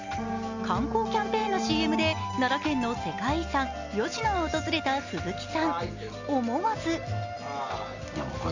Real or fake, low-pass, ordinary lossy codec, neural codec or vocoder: real; 7.2 kHz; Opus, 64 kbps; none